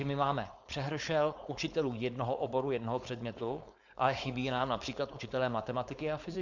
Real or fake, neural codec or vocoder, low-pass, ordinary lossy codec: fake; codec, 16 kHz, 4.8 kbps, FACodec; 7.2 kHz; Opus, 64 kbps